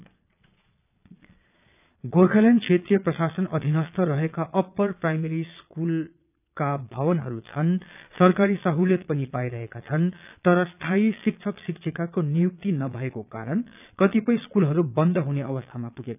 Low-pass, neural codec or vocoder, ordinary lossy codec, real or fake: 3.6 kHz; vocoder, 22.05 kHz, 80 mel bands, Vocos; none; fake